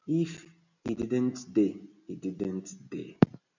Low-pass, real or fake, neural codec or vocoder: 7.2 kHz; real; none